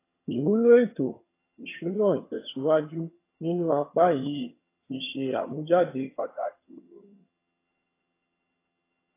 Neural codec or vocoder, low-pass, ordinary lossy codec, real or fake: vocoder, 22.05 kHz, 80 mel bands, HiFi-GAN; 3.6 kHz; AAC, 24 kbps; fake